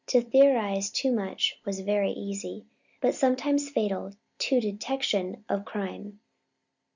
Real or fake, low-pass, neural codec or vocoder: real; 7.2 kHz; none